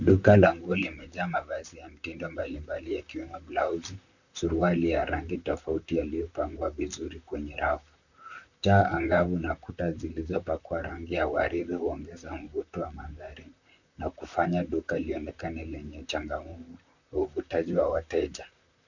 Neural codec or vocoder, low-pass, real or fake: vocoder, 44.1 kHz, 128 mel bands, Pupu-Vocoder; 7.2 kHz; fake